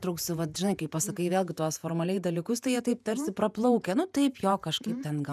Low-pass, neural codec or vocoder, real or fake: 14.4 kHz; vocoder, 48 kHz, 128 mel bands, Vocos; fake